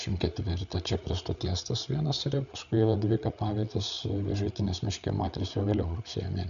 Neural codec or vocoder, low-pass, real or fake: codec, 16 kHz, 8 kbps, FreqCodec, larger model; 7.2 kHz; fake